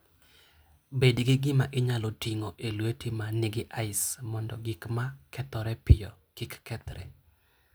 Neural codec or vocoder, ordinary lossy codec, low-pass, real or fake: none; none; none; real